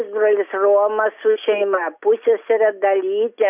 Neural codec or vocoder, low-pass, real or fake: none; 3.6 kHz; real